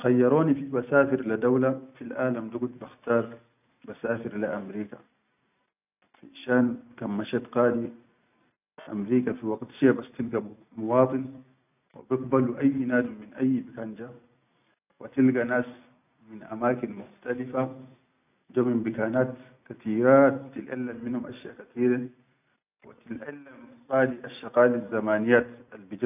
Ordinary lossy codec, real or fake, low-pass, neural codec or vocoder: none; real; 3.6 kHz; none